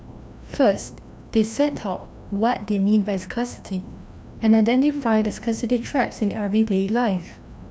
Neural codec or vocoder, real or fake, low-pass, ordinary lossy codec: codec, 16 kHz, 1 kbps, FreqCodec, larger model; fake; none; none